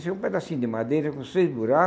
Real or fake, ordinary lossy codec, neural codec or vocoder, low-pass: real; none; none; none